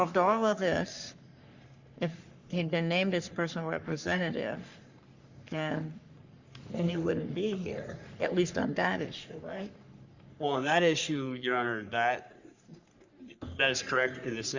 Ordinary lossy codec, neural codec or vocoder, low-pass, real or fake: Opus, 64 kbps; codec, 44.1 kHz, 3.4 kbps, Pupu-Codec; 7.2 kHz; fake